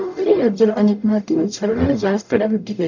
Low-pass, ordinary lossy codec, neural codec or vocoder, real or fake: 7.2 kHz; none; codec, 44.1 kHz, 0.9 kbps, DAC; fake